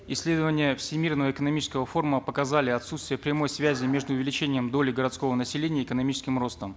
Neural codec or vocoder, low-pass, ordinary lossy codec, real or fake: none; none; none; real